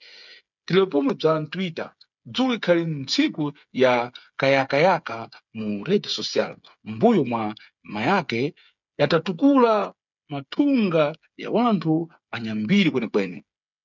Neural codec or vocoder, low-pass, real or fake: codec, 16 kHz, 8 kbps, FreqCodec, smaller model; 7.2 kHz; fake